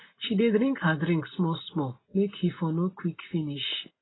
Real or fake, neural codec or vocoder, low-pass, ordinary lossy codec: real; none; 7.2 kHz; AAC, 16 kbps